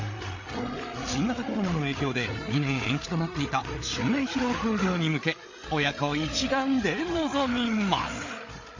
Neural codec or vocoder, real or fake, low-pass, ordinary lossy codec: codec, 16 kHz, 8 kbps, FreqCodec, larger model; fake; 7.2 kHz; MP3, 48 kbps